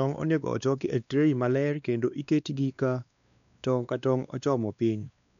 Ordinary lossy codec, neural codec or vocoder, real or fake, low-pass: none; codec, 16 kHz, 2 kbps, X-Codec, WavLM features, trained on Multilingual LibriSpeech; fake; 7.2 kHz